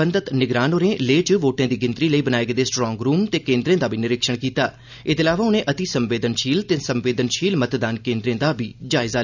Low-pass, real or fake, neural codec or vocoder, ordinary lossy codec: 7.2 kHz; real; none; none